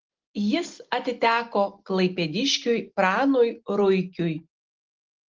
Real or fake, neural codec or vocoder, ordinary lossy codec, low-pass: real; none; Opus, 16 kbps; 7.2 kHz